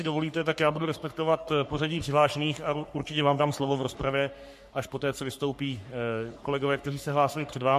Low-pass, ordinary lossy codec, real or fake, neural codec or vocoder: 14.4 kHz; MP3, 64 kbps; fake; codec, 44.1 kHz, 3.4 kbps, Pupu-Codec